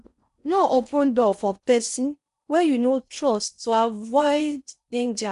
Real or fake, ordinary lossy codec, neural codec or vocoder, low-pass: fake; none; codec, 16 kHz in and 24 kHz out, 0.6 kbps, FocalCodec, streaming, 4096 codes; 10.8 kHz